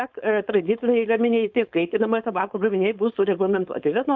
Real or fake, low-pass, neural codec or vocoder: fake; 7.2 kHz; codec, 16 kHz, 4.8 kbps, FACodec